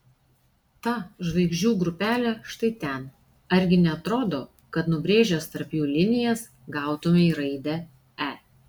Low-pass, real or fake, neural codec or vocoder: 19.8 kHz; real; none